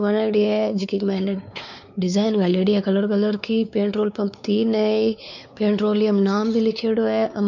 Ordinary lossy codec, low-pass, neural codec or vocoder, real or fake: none; 7.2 kHz; codec, 16 kHz, 4 kbps, X-Codec, WavLM features, trained on Multilingual LibriSpeech; fake